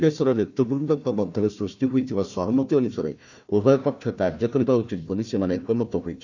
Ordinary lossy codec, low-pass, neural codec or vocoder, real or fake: none; 7.2 kHz; codec, 16 kHz, 1 kbps, FunCodec, trained on Chinese and English, 50 frames a second; fake